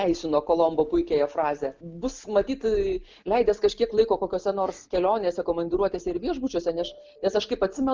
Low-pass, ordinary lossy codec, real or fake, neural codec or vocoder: 7.2 kHz; Opus, 24 kbps; real; none